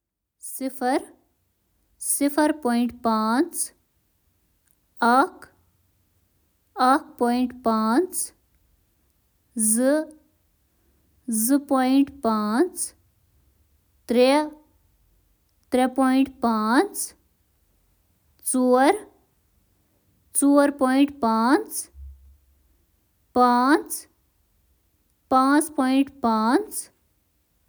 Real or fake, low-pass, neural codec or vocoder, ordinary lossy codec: real; none; none; none